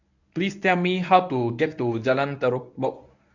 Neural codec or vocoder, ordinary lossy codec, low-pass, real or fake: codec, 24 kHz, 0.9 kbps, WavTokenizer, medium speech release version 1; none; 7.2 kHz; fake